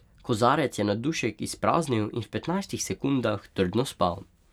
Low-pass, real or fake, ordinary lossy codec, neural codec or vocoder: 19.8 kHz; real; none; none